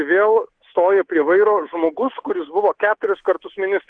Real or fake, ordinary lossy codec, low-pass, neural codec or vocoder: fake; Opus, 16 kbps; 9.9 kHz; codec, 24 kHz, 3.1 kbps, DualCodec